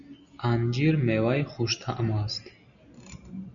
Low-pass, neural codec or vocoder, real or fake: 7.2 kHz; none; real